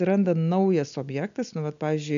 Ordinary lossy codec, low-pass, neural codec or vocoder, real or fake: MP3, 96 kbps; 7.2 kHz; none; real